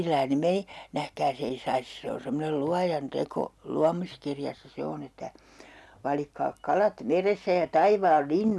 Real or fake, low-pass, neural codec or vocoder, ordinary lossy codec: real; none; none; none